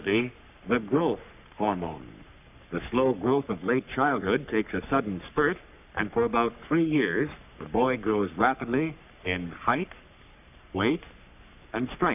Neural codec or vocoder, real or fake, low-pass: codec, 44.1 kHz, 3.4 kbps, Pupu-Codec; fake; 3.6 kHz